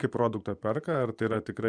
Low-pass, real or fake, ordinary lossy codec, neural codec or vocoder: 9.9 kHz; fake; Opus, 64 kbps; vocoder, 24 kHz, 100 mel bands, Vocos